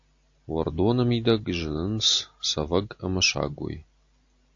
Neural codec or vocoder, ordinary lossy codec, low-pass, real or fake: none; AAC, 48 kbps; 7.2 kHz; real